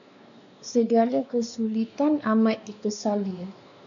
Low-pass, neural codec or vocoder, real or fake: 7.2 kHz; codec, 16 kHz, 2 kbps, X-Codec, WavLM features, trained on Multilingual LibriSpeech; fake